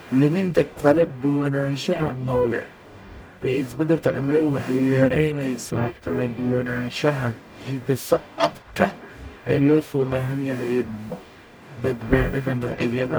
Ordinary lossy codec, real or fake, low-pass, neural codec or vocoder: none; fake; none; codec, 44.1 kHz, 0.9 kbps, DAC